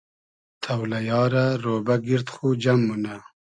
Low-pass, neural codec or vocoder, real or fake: 9.9 kHz; none; real